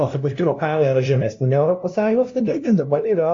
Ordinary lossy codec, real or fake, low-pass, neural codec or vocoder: AAC, 48 kbps; fake; 7.2 kHz; codec, 16 kHz, 0.5 kbps, FunCodec, trained on LibriTTS, 25 frames a second